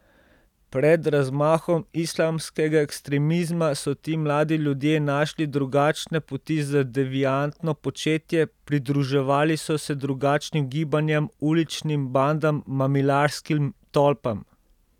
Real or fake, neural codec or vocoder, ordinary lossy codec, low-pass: real; none; none; 19.8 kHz